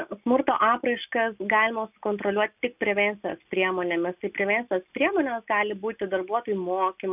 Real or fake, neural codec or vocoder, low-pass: real; none; 3.6 kHz